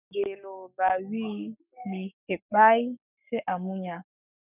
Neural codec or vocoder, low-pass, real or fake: codec, 44.1 kHz, 7.8 kbps, Pupu-Codec; 3.6 kHz; fake